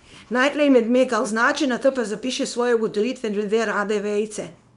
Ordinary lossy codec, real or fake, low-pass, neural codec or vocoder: none; fake; 10.8 kHz; codec, 24 kHz, 0.9 kbps, WavTokenizer, small release